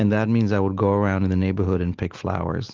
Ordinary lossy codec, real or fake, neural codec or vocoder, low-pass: Opus, 16 kbps; real; none; 7.2 kHz